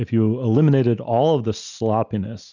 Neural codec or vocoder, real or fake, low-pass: none; real; 7.2 kHz